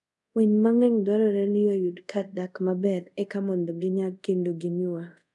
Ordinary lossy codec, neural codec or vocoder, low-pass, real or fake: none; codec, 24 kHz, 0.5 kbps, DualCodec; 10.8 kHz; fake